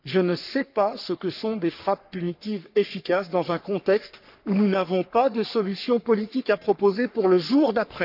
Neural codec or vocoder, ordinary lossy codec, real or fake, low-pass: codec, 44.1 kHz, 3.4 kbps, Pupu-Codec; none; fake; 5.4 kHz